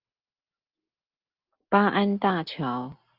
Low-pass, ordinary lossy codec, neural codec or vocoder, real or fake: 5.4 kHz; Opus, 32 kbps; none; real